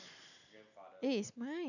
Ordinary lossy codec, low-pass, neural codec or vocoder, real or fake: none; 7.2 kHz; none; real